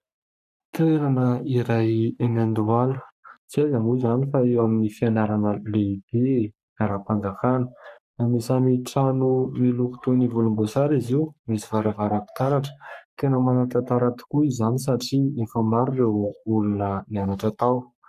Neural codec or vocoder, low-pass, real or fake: codec, 44.1 kHz, 3.4 kbps, Pupu-Codec; 14.4 kHz; fake